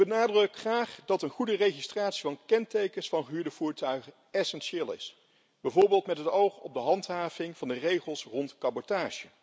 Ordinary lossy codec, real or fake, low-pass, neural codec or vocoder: none; real; none; none